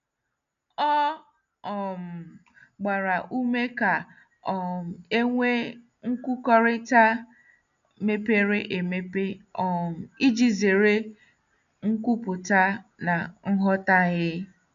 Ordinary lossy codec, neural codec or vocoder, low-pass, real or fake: none; none; 7.2 kHz; real